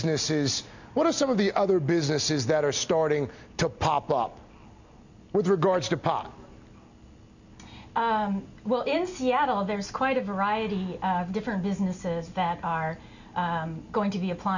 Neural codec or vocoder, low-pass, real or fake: none; 7.2 kHz; real